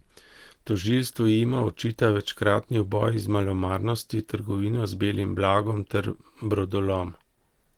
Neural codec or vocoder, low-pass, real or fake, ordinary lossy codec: none; 19.8 kHz; real; Opus, 16 kbps